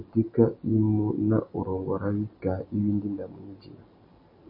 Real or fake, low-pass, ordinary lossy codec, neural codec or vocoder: real; 5.4 kHz; MP3, 24 kbps; none